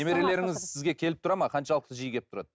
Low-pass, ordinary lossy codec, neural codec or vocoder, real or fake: none; none; none; real